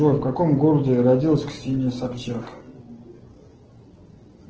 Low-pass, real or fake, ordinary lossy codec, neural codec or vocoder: 7.2 kHz; real; Opus, 16 kbps; none